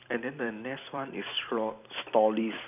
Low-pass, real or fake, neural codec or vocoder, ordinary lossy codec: 3.6 kHz; fake; vocoder, 44.1 kHz, 128 mel bands every 256 samples, BigVGAN v2; none